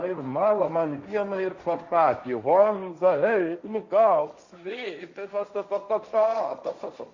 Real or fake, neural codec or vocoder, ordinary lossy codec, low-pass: fake; codec, 16 kHz, 1.1 kbps, Voila-Tokenizer; Opus, 64 kbps; 7.2 kHz